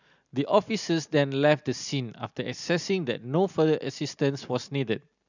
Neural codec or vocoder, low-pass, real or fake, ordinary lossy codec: none; 7.2 kHz; real; none